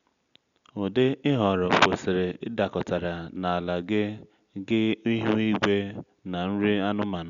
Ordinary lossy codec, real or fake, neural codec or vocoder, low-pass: none; real; none; 7.2 kHz